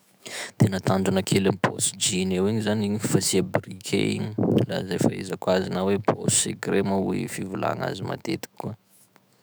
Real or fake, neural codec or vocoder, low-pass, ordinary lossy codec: fake; autoencoder, 48 kHz, 128 numbers a frame, DAC-VAE, trained on Japanese speech; none; none